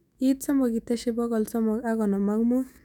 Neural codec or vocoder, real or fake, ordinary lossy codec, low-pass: autoencoder, 48 kHz, 128 numbers a frame, DAC-VAE, trained on Japanese speech; fake; none; 19.8 kHz